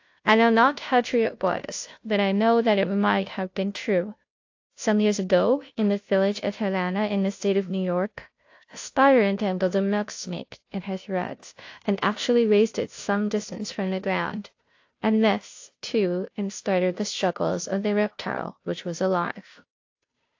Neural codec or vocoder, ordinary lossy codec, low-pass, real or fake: codec, 16 kHz, 0.5 kbps, FunCodec, trained on Chinese and English, 25 frames a second; AAC, 48 kbps; 7.2 kHz; fake